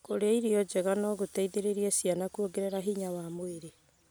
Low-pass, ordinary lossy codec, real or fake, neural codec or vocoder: none; none; real; none